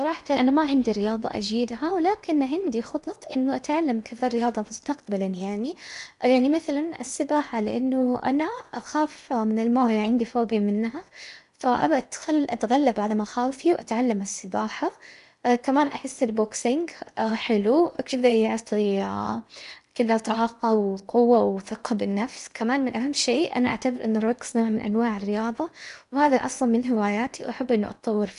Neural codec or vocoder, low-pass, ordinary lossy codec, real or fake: codec, 16 kHz in and 24 kHz out, 0.8 kbps, FocalCodec, streaming, 65536 codes; 10.8 kHz; none; fake